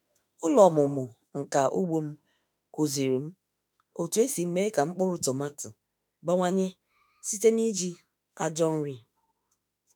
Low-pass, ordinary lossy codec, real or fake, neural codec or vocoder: none; none; fake; autoencoder, 48 kHz, 32 numbers a frame, DAC-VAE, trained on Japanese speech